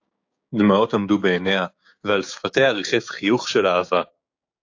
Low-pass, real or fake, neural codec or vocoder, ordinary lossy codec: 7.2 kHz; fake; codec, 16 kHz, 6 kbps, DAC; AAC, 48 kbps